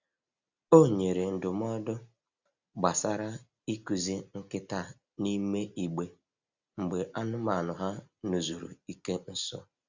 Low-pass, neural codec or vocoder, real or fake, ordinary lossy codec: 7.2 kHz; none; real; Opus, 64 kbps